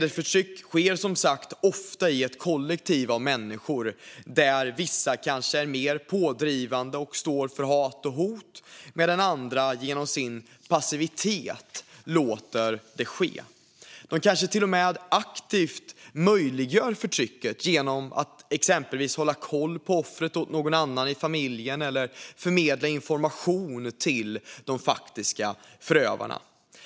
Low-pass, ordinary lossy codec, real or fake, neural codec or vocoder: none; none; real; none